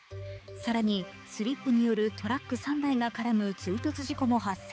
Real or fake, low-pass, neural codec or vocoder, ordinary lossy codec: fake; none; codec, 16 kHz, 4 kbps, X-Codec, HuBERT features, trained on balanced general audio; none